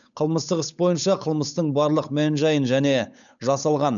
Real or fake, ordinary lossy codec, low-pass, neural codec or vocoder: fake; none; 7.2 kHz; codec, 16 kHz, 8 kbps, FunCodec, trained on Chinese and English, 25 frames a second